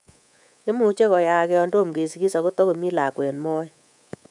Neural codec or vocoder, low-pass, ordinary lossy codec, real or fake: codec, 24 kHz, 3.1 kbps, DualCodec; 10.8 kHz; none; fake